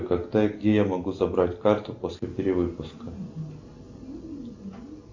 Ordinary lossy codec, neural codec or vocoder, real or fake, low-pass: AAC, 48 kbps; none; real; 7.2 kHz